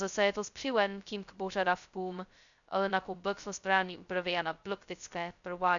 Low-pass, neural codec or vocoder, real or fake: 7.2 kHz; codec, 16 kHz, 0.2 kbps, FocalCodec; fake